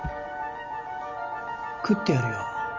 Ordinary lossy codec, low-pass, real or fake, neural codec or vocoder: Opus, 32 kbps; 7.2 kHz; real; none